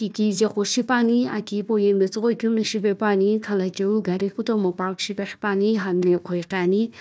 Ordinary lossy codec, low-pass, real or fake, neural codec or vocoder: none; none; fake; codec, 16 kHz, 1 kbps, FunCodec, trained on Chinese and English, 50 frames a second